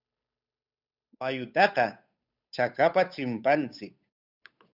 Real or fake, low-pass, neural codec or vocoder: fake; 5.4 kHz; codec, 16 kHz, 8 kbps, FunCodec, trained on Chinese and English, 25 frames a second